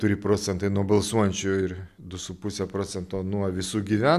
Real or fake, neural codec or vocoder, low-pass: real; none; 14.4 kHz